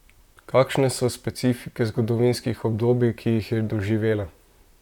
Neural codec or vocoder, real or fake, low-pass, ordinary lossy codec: vocoder, 44.1 kHz, 128 mel bands, Pupu-Vocoder; fake; 19.8 kHz; none